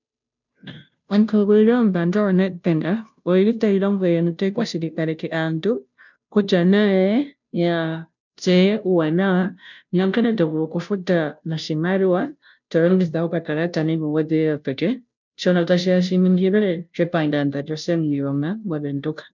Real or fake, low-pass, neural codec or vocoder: fake; 7.2 kHz; codec, 16 kHz, 0.5 kbps, FunCodec, trained on Chinese and English, 25 frames a second